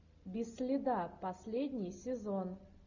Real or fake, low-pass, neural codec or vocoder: real; 7.2 kHz; none